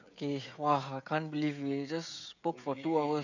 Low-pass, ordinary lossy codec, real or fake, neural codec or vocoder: 7.2 kHz; none; fake; codec, 16 kHz, 16 kbps, FreqCodec, smaller model